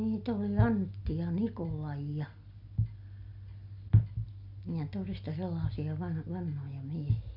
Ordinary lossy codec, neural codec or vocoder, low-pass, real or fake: none; none; 5.4 kHz; real